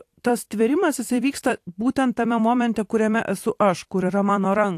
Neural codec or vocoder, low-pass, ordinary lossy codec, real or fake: vocoder, 44.1 kHz, 128 mel bands every 256 samples, BigVGAN v2; 14.4 kHz; AAC, 64 kbps; fake